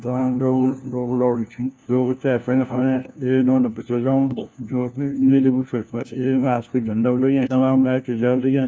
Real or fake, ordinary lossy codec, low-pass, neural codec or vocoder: fake; none; none; codec, 16 kHz, 1 kbps, FunCodec, trained on LibriTTS, 50 frames a second